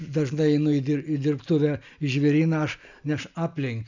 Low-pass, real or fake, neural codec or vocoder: 7.2 kHz; real; none